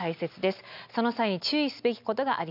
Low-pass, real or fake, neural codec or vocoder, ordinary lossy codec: 5.4 kHz; real; none; none